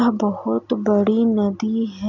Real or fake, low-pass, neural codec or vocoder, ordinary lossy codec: real; 7.2 kHz; none; none